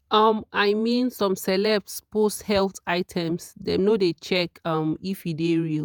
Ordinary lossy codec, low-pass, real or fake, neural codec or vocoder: none; none; fake; vocoder, 48 kHz, 128 mel bands, Vocos